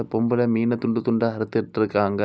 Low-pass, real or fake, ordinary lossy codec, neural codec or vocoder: none; real; none; none